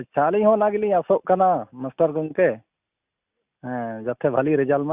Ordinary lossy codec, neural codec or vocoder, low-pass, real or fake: Opus, 64 kbps; none; 3.6 kHz; real